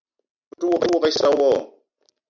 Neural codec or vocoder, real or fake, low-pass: none; real; 7.2 kHz